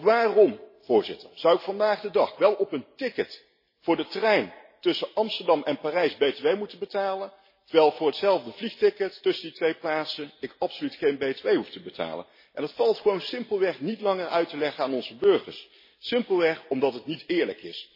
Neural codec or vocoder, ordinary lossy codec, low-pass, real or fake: none; MP3, 24 kbps; 5.4 kHz; real